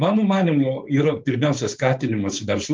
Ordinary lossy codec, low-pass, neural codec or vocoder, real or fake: Opus, 24 kbps; 7.2 kHz; codec, 16 kHz, 4.8 kbps, FACodec; fake